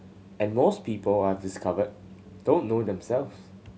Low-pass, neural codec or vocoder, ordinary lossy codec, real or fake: none; none; none; real